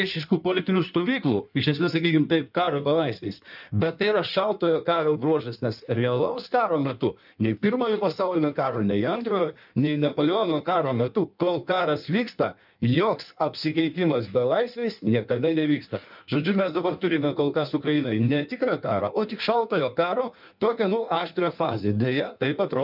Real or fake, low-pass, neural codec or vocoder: fake; 5.4 kHz; codec, 16 kHz in and 24 kHz out, 1.1 kbps, FireRedTTS-2 codec